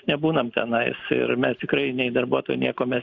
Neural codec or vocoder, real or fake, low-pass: none; real; 7.2 kHz